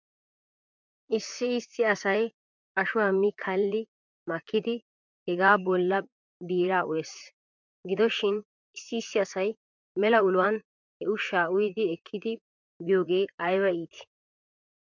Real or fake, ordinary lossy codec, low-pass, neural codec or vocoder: fake; MP3, 64 kbps; 7.2 kHz; vocoder, 44.1 kHz, 128 mel bands, Pupu-Vocoder